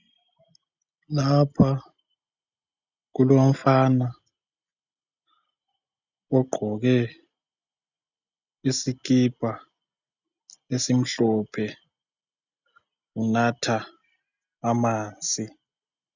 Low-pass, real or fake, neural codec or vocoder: 7.2 kHz; real; none